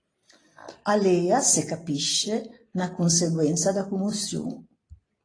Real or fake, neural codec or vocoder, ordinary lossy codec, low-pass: real; none; AAC, 32 kbps; 9.9 kHz